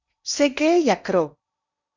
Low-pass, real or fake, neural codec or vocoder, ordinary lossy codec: 7.2 kHz; fake; codec, 16 kHz in and 24 kHz out, 0.8 kbps, FocalCodec, streaming, 65536 codes; Opus, 64 kbps